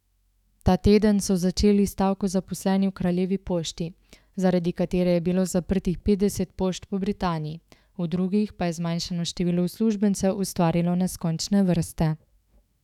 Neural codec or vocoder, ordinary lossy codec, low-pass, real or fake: autoencoder, 48 kHz, 128 numbers a frame, DAC-VAE, trained on Japanese speech; none; 19.8 kHz; fake